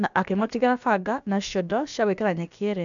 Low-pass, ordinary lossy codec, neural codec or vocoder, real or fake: 7.2 kHz; none; codec, 16 kHz, about 1 kbps, DyCAST, with the encoder's durations; fake